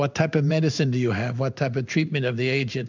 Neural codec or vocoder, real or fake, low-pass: codec, 16 kHz in and 24 kHz out, 1 kbps, XY-Tokenizer; fake; 7.2 kHz